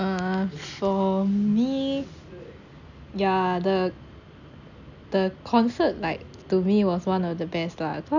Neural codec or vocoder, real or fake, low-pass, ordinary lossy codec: none; real; 7.2 kHz; none